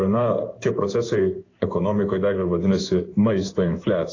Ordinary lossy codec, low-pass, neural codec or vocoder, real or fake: AAC, 32 kbps; 7.2 kHz; codec, 16 kHz in and 24 kHz out, 1 kbps, XY-Tokenizer; fake